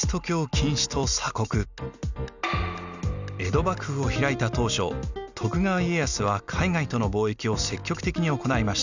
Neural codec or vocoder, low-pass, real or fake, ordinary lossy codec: none; 7.2 kHz; real; none